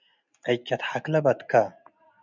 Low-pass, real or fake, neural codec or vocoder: 7.2 kHz; real; none